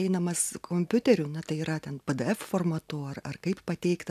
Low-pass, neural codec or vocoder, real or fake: 14.4 kHz; none; real